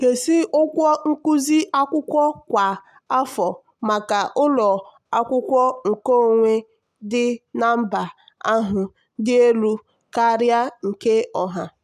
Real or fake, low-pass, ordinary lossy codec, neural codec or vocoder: real; 14.4 kHz; none; none